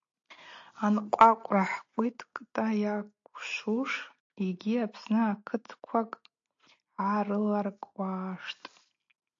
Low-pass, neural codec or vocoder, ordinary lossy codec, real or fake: 7.2 kHz; none; AAC, 48 kbps; real